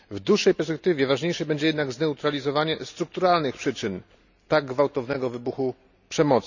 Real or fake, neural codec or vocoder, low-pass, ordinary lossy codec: real; none; 7.2 kHz; none